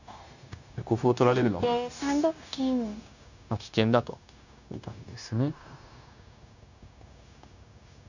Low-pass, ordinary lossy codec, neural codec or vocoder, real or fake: 7.2 kHz; none; codec, 16 kHz, 0.9 kbps, LongCat-Audio-Codec; fake